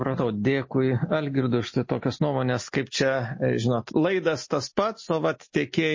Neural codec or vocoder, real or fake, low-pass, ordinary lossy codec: none; real; 7.2 kHz; MP3, 32 kbps